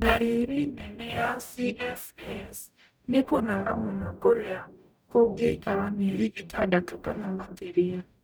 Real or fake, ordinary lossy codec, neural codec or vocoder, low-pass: fake; none; codec, 44.1 kHz, 0.9 kbps, DAC; none